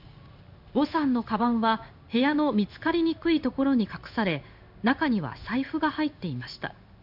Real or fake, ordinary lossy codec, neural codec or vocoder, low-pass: fake; none; codec, 16 kHz in and 24 kHz out, 1 kbps, XY-Tokenizer; 5.4 kHz